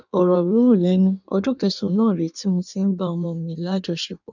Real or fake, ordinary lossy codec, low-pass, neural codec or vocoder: fake; none; 7.2 kHz; codec, 16 kHz in and 24 kHz out, 1.1 kbps, FireRedTTS-2 codec